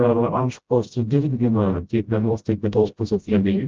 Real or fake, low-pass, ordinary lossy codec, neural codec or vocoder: fake; 7.2 kHz; Opus, 24 kbps; codec, 16 kHz, 0.5 kbps, FreqCodec, smaller model